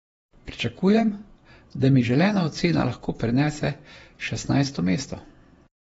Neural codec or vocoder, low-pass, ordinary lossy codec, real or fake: vocoder, 48 kHz, 128 mel bands, Vocos; 19.8 kHz; AAC, 24 kbps; fake